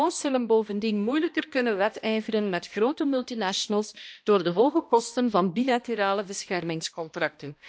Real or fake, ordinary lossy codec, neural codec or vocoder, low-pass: fake; none; codec, 16 kHz, 1 kbps, X-Codec, HuBERT features, trained on balanced general audio; none